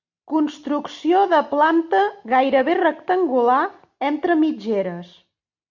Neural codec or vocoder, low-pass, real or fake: none; 7.2 kHz; real